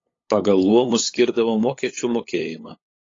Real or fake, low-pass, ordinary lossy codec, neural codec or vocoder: fake; 7.2 kHz; AAC, 32 kbps; codec, 16 kHz, 8 kbps, FunCodec, trained on LibriTTS, 25 frames a second